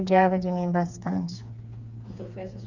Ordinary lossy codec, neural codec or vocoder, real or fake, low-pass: Opus, 64 kbps; codec, 16 kHz, 4 kbps, FreqCodec, smaller model; fake; 7.2 kHz